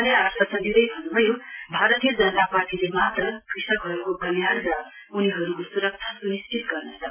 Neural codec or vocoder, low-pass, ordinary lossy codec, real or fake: none; 3.6 kHz; MP3, 24 kbps; real